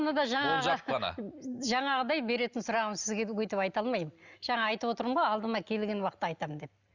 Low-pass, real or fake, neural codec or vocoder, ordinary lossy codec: 7.2 kHz; real; none; Opus, 64 kbps